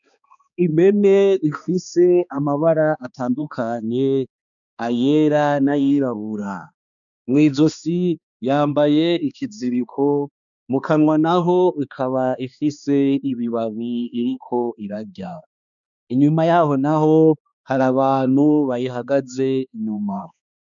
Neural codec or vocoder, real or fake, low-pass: codec, 16 kHz, 2 kbps, X-Codec, HuBERT features, trained on balanced general audio; fake; 7.2 kHz